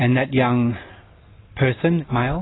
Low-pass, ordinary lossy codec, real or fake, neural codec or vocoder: 7.2 kHz; AAC, 16 kbps; real; none